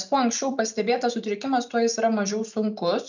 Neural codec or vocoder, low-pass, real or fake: none; 7.2 kHz; real